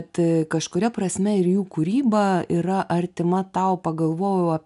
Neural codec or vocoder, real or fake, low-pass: none; real; 10.8 kHz